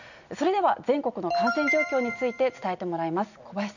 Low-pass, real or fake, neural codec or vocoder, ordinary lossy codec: 7.2 kHz; real; none; none